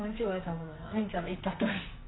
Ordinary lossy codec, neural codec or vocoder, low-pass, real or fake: AAC, 16 kbps; codec, 44.1 kHz, 2.6 kbps, SNAC; 7.2 kHz; fake